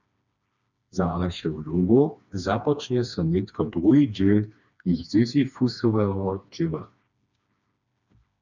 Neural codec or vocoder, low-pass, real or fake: codec, 16 kHz, 2 kbps, FreqCodec, smaller model; 7.2 kHz; fake